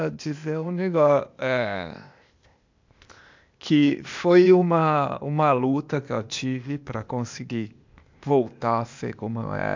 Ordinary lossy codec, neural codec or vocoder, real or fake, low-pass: MP3, 64 kbps; codec, 16 kHz, 0.8 kbps, ZipCodec; fake; 7.2 kHz